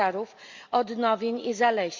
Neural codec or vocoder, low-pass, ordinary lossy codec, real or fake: none; 7.2 kHz; Opus, 64 kbps; real